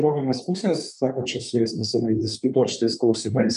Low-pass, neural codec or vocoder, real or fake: 10.8 kHz; codec, 24 kHz, 1 kbps, SNAC; fake